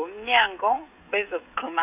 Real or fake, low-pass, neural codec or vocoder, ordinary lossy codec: fake; 3.6 kHz; codec, 16 kHz, 16 kbps, FreqCodec, smaller model; none